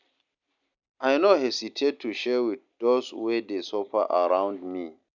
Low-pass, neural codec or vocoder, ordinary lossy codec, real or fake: 7.2 kHz; none; none; real